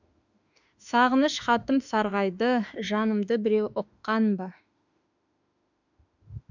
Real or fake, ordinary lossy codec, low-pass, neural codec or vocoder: fake; none; 7.2 kHz; autoencoder, 48 kHz, 32 numbers a frame, DAC-VAE, trained on Japanese speech